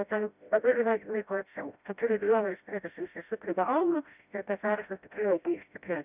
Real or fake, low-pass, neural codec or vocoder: fake; 3.6 kHz; codec, 16 kHz, 0.5 kbps, FreqCodec, smaller model